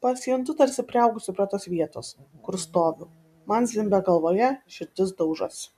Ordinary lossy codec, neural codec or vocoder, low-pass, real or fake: MP3, 96 kbps; none; 14.4 kHz; real